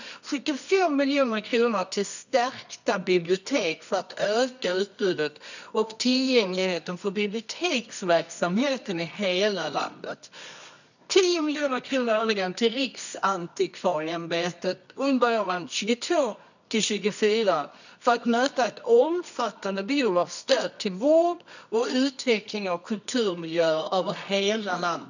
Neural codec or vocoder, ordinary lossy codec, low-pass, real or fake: codec, 24 kHz, 0.9 kbps, WavTokenizer, medium music audio release; none; 7.2 kHz; fake